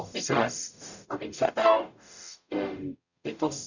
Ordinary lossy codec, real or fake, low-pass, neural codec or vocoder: none; fake; 7.2 kHz; codec, 44.1 kHz, 0.9 kbps, DAC